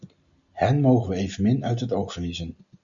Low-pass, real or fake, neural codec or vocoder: 7.2 kHz; real; none